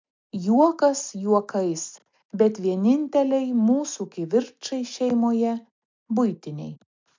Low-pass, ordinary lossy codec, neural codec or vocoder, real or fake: 7.2 kHz; MP3, 64 kbps; none; real